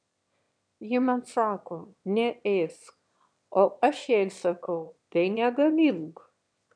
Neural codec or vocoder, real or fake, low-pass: autoencoder, 22.05 kHz, a latent of 192 numbers a frame, VITS, trained on one speaker; fake; 9.9 kHz